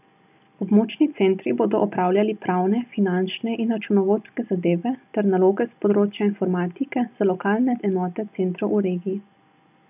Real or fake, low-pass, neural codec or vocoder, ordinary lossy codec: real; 3.6 kHz; none; none